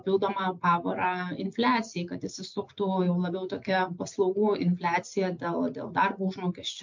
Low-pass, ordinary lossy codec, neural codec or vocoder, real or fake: 7.2 kHz; MP3, 48 kbps; none; real